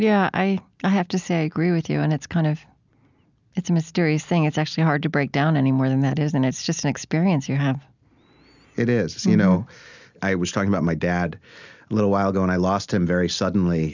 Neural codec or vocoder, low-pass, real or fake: none; 7.2 kHz; real